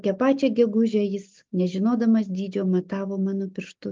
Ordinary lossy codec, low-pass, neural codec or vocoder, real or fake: Opus, 32 kbps; 7.2 kHz; none; real